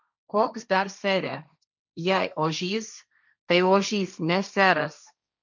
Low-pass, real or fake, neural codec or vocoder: 7.2 kHz; fake; codec, 16 kHz, 1.1 kbps, Voila-Tokenizer